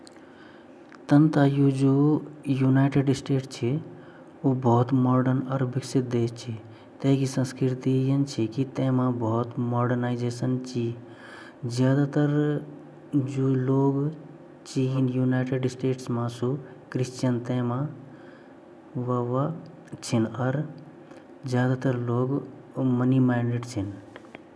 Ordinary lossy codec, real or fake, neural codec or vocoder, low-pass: none; real; none; none